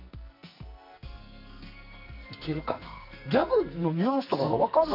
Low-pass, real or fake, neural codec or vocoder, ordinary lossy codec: 5.4 kHz; fake; codec, 44.1 kHz, 2.6 kbps, SNAC; none